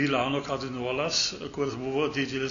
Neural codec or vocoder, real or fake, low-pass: none; real; 7.2 kHz